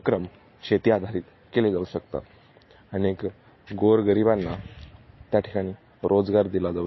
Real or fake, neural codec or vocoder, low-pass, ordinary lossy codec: real; none; 7.2 kHz; MP3, 24 kbps